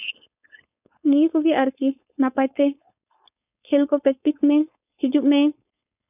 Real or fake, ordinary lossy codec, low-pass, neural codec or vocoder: fake; none; 3.6 kHz; codec, 16 kHz, 4.8 kbps, FACodec